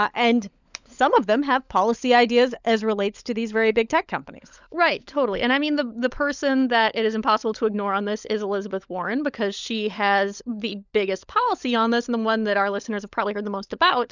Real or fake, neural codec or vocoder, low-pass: fake; codec, 16 kHz, 16 kbps, FunCodec, trained on LibriTTS, 50 frames a second; 7.2 kHz